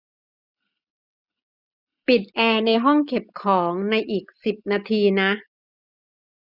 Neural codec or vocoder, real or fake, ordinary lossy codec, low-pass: none; real; none; 5.4 kHz